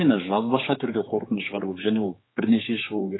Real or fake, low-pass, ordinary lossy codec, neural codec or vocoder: fake; 7.2 kHz; AAC, 16 kbps; codec, 16 kHz, 4 kbps, X-Codec, HuBERT features, trained on general audio